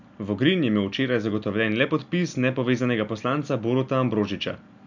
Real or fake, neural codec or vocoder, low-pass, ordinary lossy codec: real; none; 7.2 kHz; none